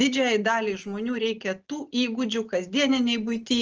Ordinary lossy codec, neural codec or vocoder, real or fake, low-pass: Opus, 24 kbps; none; real; 7.2 kHz